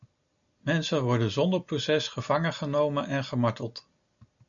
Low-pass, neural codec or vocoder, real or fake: 7.2 kHz; none; real